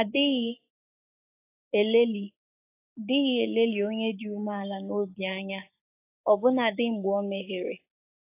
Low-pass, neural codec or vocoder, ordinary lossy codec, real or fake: 3.6 kHz; codec, 16 kHz, 6 kbps, DAC; AAC, 24 kbps; fake